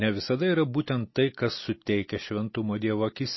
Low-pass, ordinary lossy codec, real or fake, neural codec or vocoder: 7.2 kHz; MP3, 24 kbps; real; none